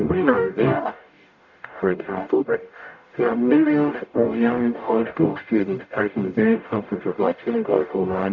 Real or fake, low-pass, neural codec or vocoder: fake; 7.2 kHz; codec, 44.1 kHz, 0.9 kbps, DAC